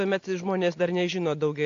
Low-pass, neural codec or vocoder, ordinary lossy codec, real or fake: 7.2 kHz; none; AAC, 48 kbps; real